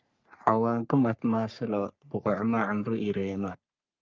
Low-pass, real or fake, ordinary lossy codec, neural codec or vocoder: 7.2 kHz; fake; Opus, 24 kbps; codec, 44.1 kHz, 3.4 kbps, Pupu-Codec